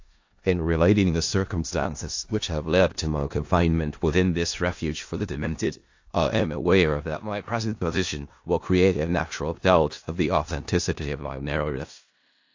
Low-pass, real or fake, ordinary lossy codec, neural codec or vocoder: 7.2 kHz; fake; AAC, 48 kbps; codec, 16 kHz in and 24 kHz out, 0.4 kbps, LongCat-Audio-Codec, four codebook decoder